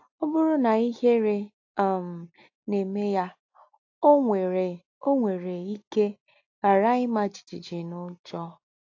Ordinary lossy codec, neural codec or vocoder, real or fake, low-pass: AAC, 48 kbps; none; real; 7.2 kHz